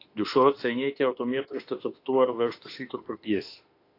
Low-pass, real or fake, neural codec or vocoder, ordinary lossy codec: 5.4 kHz; fake; autoencoder, 48 kHz, 32 numbers a frame, DAC-VAE, trained on Japanese speech; AAC, 32 kbps